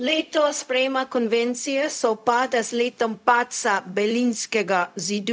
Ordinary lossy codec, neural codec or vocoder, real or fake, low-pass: none; codec, 16 kHz, 0.4 kbps, LongCat-Audio-Codec; fake; none